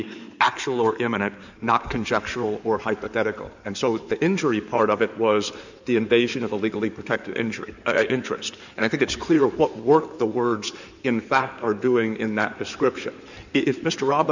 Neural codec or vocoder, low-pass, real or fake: codec, 16 kHz in and 24 kHz out, 2.2 kbps, FireRedTTS-2 codec; 7.2 kHz; fake